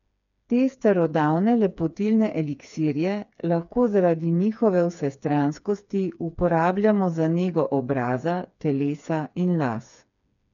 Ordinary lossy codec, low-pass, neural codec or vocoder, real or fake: none; 7.2 kHz; codec, 16 kHz, 4 kbps, FreqCodec, smaller model; fake